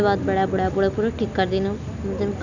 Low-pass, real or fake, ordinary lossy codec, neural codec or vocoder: 7.2 kHz; real; none; none